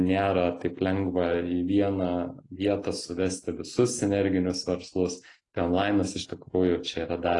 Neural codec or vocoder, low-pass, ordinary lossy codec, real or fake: none; 10.8 kHz; AAC, 32 kbps; real